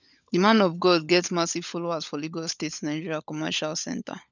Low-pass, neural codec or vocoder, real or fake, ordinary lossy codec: 7.2 kHz; codec, 16 kHz, 16 kbps, FunCodec, trained on LibriTTS, 50 frames a second; fake; none